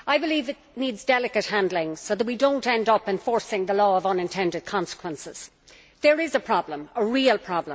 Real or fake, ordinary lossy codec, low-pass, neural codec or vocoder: real; none; none; none